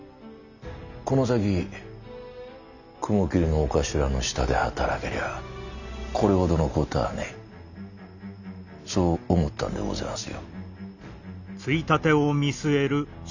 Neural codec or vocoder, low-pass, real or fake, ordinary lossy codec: none; 7.2 kHz; real; none